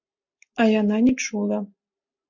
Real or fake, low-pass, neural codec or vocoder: real; 7.2 kHz; none